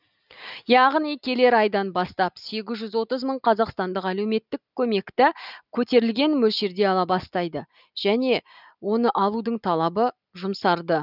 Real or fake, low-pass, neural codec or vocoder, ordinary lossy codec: real; 5.4 kHz; none; none